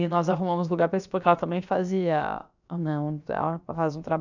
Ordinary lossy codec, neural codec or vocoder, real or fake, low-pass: none; codec, 16 kHz, about 1 kbps, DyCAST, with the encoder's durations; fake; 7.2 kHz